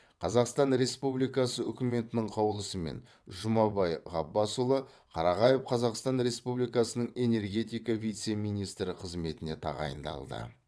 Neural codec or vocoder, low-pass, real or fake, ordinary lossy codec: vocoder, 22.05 kHz, 80 mel bands, WaveNeXt; none; fake; none